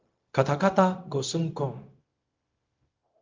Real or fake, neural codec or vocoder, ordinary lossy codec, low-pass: fake; codec, 16 kHz, 0.4 kbps, LongCat-Audio-Codec; Opus, 24 kbps; 7.2 kHz